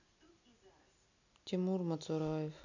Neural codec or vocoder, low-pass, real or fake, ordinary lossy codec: none; 7.2 kHz; real; none